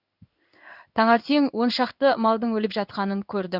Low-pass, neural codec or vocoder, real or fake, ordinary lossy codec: 5.4 kHz; codec, 16 kHz in and 24 kHz out, 1 kbps, XY-Tokenizer; fake; Opus, 64 kbps